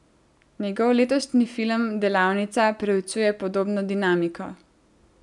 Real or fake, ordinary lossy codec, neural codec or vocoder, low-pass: real; none; none; 10.8 kHz